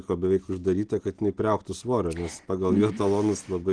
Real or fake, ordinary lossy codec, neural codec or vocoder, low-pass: real; Opus, 16 kbps; none; 9.9 kHz